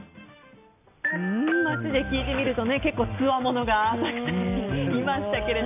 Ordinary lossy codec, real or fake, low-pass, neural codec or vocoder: none; real; 3.6 kHz; none